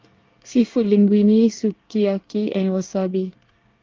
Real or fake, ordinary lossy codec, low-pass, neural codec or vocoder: fake; Opus, 32 kbps; 7.2 kHz; codec, 24 kHz, 1 kbps, SNAC